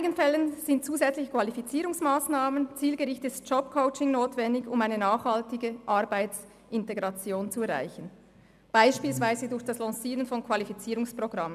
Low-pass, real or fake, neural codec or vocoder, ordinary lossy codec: 14.4 kHz; real; none; none